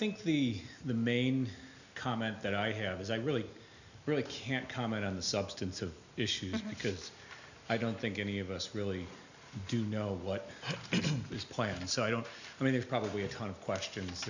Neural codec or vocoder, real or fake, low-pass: none; real; 7.2 kHz